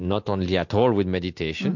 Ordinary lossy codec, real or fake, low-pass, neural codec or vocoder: MP3, 48 kbps; real; 7.2 kHz; none